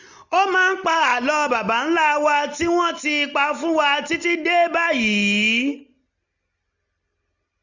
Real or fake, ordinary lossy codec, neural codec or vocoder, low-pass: real; none; none; 7.2 kHz